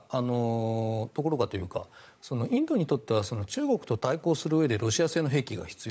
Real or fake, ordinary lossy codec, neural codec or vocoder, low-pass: fake; none; codec, 16 kHz, 16 kbps, FunCodec, trained on LibriTTS, 50 frames a second; none